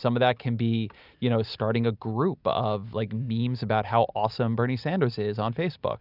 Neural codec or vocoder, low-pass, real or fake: none; 5.4 kHz; real